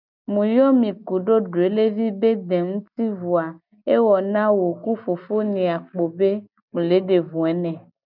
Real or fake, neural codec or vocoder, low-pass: real; none; 5.4 kHz